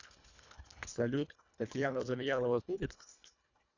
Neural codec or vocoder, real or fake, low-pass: codec, 24 kHz, 1.5 kbps, HILCodec; fake; 7.2 kHz